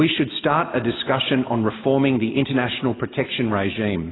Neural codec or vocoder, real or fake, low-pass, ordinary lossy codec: none; real; 7.2 kHz; AAC, 16 kbps